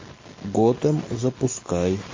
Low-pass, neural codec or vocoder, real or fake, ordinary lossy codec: 7.2 kHz; none; real; MP3, 32 kbps